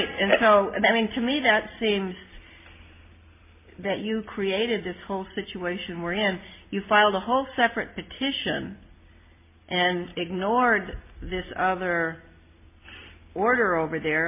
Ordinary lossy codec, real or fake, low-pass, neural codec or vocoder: MP3, 24 kbps; real; 3.6 kHz; none